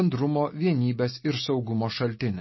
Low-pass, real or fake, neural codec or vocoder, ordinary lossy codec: 7.2 kHz; real; none; MP3, 24 kbps